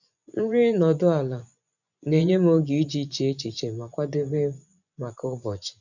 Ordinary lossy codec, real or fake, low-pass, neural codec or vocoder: none; fake; 7.2 kHz; vocoder, 24 kHz, 100 mel bands, Vocos